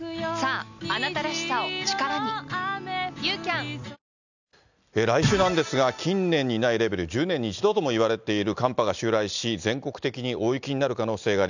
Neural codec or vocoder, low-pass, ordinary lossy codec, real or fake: none; 7.2 kHz; none; real